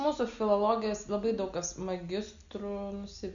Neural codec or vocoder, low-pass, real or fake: none; 7.2 kHz; real